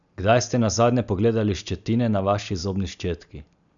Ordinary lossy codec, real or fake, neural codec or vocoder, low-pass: none; real; none; 7.2 kHz